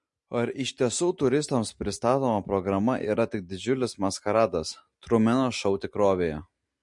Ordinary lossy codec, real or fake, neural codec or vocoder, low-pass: MP3, 48 kbps; real; none; 10.8 kHz